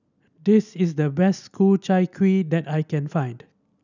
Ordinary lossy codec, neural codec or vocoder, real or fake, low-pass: none; none; real; 7.2 kHz